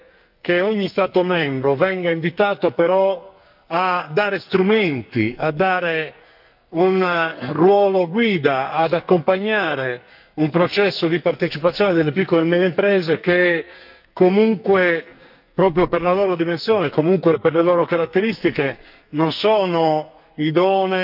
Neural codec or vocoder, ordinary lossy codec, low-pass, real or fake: codec, 44.1 kHz, 2.6 kbps, SNAC; none; 5.4 kHz; fake